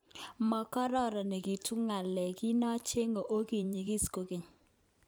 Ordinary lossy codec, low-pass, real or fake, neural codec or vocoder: none; none; fake; vocoder, 44.1 kHz, 128 mel bands every 512 samples, BigVGAN v2